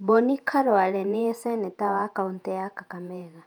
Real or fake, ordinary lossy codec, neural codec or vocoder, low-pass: fake; none; vocoder, 48 kHz, 128 mel bands, Vocos; 19.8 kHz